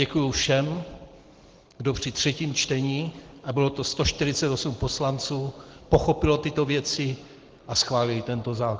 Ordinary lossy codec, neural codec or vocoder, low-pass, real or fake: Opus, 16 kbps; none; 7.2 kHz; real